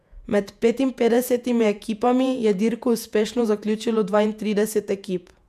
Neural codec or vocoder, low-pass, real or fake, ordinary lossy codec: vocoder, 48 kHz, 128 mel bands, Vocos; 14.4 kHz; fake; none